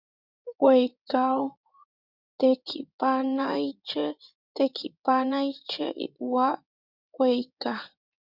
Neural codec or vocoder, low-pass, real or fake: codec, 16 kHz in and 24 kHz out, 2.2 kbps, FireRedTTS-2 codec; 5.4 kHz; fake